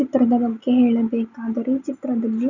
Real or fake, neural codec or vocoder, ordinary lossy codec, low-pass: real; none; none; 7.2 kHz